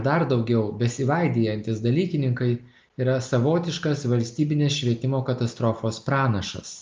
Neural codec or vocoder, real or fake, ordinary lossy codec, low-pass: none; real; Opus, 24 kbps; 7.2 kHz